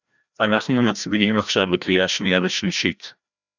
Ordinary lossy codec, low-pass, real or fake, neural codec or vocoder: Opus, 64 kbps; 7.2 kHz; fake; codec, 16 kHz, 1 kbps, FreqCodec, larger model